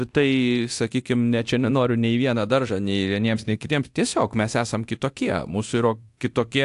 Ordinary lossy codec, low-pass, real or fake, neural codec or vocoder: AAC, 64 kbps; 10.8 kHz; fake; codec, 24 kHz, 0.9 kbps, DualCodec